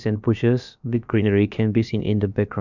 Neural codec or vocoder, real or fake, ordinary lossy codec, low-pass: codec, 16 kHz, about 1 kbps, DyCAST, with the encoder's durations; fake; none; 7.2 kHz